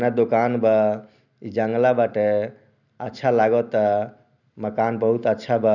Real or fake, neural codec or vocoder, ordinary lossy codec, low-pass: real; none; none; 7.2 kHz